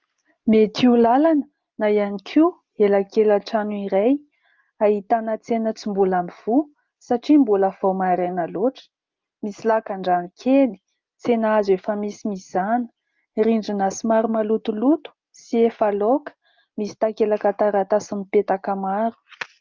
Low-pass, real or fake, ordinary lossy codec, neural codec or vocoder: 7.2 kHz; real; Opus, 24 kbps; none